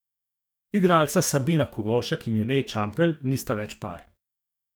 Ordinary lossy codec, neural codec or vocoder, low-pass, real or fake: none; codec, 44.1 kHz, 2.6 kbps, DAC; none; fake